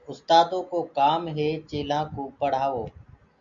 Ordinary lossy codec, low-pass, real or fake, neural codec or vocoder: Opus, 64 kbps; 7.2 kHz; real; none